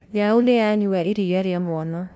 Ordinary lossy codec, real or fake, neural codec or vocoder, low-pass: none; fake; codec, 16 kHz, 0.5 kbps, FunCodec, trained on LibriTTS, 25 frames a second; none